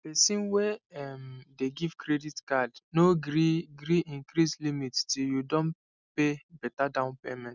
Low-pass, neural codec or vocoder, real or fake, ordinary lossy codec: 7.2 kHz; none; real; none